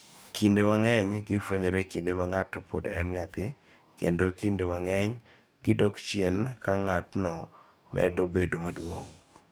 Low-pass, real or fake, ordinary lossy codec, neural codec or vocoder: none; fake; none; codec, 44.1 kHz, 2.6 kbps, DAC